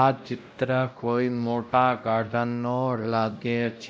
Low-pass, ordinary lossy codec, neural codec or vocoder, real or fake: none; none; codec, 16 kHz, 0.5 kbps, X-Codec, WavLM features, trained on Multilingual LibriSpeech; fake